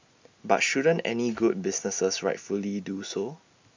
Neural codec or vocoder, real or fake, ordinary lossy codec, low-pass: none; real; MP3, 64 kbps; 7.2 kHz